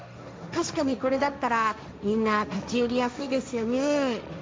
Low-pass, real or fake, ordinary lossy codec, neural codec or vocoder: none; fake; none; codec, 16 kHz, 1.1 kbps, Voila-Tokenizer